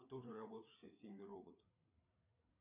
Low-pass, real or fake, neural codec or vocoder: 3.6 kHz; fake; codec, 16 kHz, 4 kbps, FreqCodec, larger model